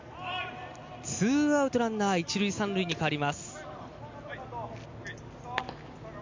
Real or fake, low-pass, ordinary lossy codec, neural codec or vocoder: real; 7.2 kHz; MP3, 64 kbps; none